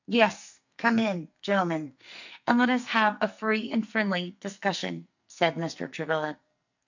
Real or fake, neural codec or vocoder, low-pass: fake; codec, 44.1 kHz, 2.6 kbps, SNAC; 7.2 kHz